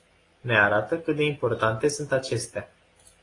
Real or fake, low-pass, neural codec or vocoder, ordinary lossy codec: real; 10.8 kHz; none; AAC, 32 kbps